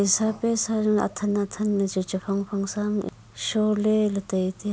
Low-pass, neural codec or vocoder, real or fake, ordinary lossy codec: none; none; real; none